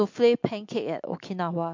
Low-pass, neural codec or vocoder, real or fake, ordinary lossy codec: 7.2 kHz; none; real; MP3, 48 kbps